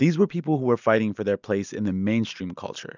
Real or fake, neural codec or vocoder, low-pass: real; none; 7.2 kHz